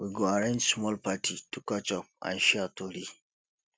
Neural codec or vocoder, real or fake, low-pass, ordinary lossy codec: none; real; none; none